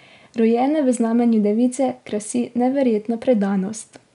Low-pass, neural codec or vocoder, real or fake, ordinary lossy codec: 10.8 kHz; none; real; none